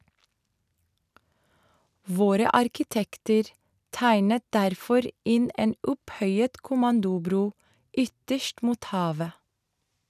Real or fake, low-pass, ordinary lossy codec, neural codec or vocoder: real; 14.4 kHz; none; none